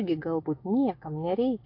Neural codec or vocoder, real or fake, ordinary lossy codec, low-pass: none; real; AAC, 32 kbps; 5.4 kHz